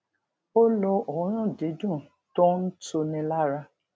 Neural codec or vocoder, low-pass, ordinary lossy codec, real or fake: none; none; none; real